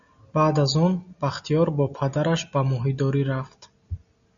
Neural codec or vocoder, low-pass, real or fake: none; 7.2 kHz; real